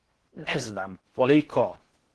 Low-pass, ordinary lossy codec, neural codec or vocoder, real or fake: 10.8 kHz; Opus, 16 kbps; codec, 16 kHz in and 24 kHz out, 0.6 kbps, FocalCodec, streaming, 4096 codes; fake